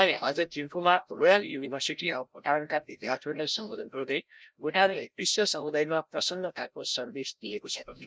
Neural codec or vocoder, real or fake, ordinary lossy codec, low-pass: codec, 16 kHz, 0.5 kbps, FreqCodec, larger model; fake; none; none